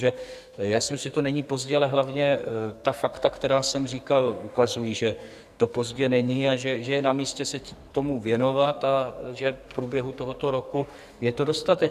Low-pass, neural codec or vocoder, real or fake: 14.4 kHz; codec, 44.1 kHz, 2.6 kbps, SNAC; fake